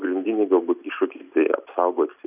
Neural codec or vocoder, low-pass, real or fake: none; 3.6 kHz; real